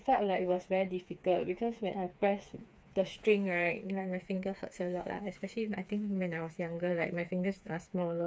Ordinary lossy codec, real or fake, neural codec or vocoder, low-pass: none; fake; codec, 16 kHz, 4 kbps, FreqCodec, smaller model; none